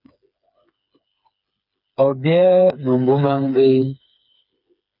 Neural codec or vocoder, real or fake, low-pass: codec, 16 kHz, 4 kbps, FreqCodec, smaller model; fake; 5.4 kHz